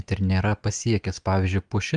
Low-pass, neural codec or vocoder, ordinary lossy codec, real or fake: 9.9 kHz; none; Opus, 32 kbps; real